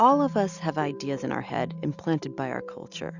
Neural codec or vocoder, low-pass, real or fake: none; 7.2 kHz; real